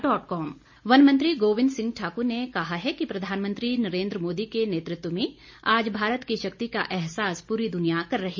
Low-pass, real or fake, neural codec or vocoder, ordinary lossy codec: 7.2 kHz; real; none; MP3, 48 kbps